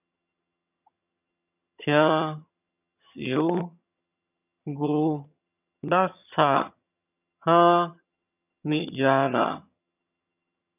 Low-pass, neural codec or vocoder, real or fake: 3.6 kHz; vocoder, 22.05 kHz, 80 mel bands, HiFi-GAN; fake